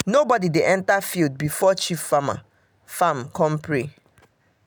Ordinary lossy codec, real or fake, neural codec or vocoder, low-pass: none; real; none; none